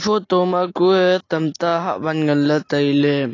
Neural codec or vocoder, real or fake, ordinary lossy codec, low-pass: none; real; AAC, 32 kbps; 7.2 kHz